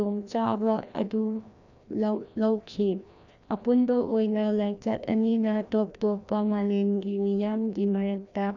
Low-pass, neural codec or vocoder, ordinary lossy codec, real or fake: 7.2 kHz; codec, 16 kHz, 1 kbps, FreqCodec, larger model; none; fake